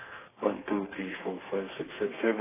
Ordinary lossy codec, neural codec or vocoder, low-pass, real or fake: AAC, 16 kbps; codec, 32 kHz, 1.9 kbps, SNAC; 3.6 kHz; fake